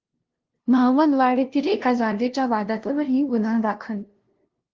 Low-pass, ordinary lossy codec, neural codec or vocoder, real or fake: 7.2 kHz; Opus, 16 kbps; codec, 16 kHz, 0.5 kbps, FunCodec, trained on LibriTTS, 25 frames a second; fake